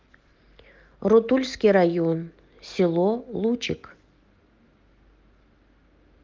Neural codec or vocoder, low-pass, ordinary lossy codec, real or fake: none; 7.2 kHz; Opus, 24 kbps; real